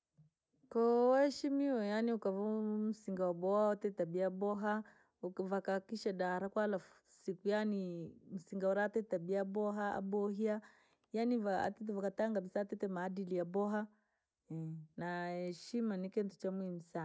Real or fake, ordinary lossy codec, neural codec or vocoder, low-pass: real; none; none; none